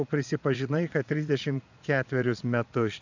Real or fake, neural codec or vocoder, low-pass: fake; vocoder, 22.05 kHz, 80 mel bands, Vocos; 7.2 kHz